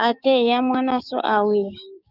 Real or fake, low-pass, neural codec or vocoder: fake; 5.4 kHz; codec, 16 kHz, 6 kbps, DAC